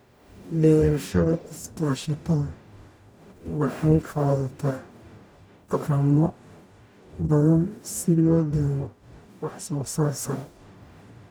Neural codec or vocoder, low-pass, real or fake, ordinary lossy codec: codec, 44.1 kHz, 0.9 kbps, DAC; none; fake; none